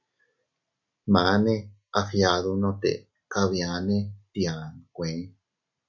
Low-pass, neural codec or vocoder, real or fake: 7.2 kHz; none; real